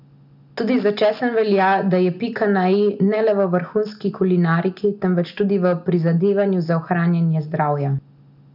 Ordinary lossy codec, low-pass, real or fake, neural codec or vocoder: none; 5.4 kHz; real; none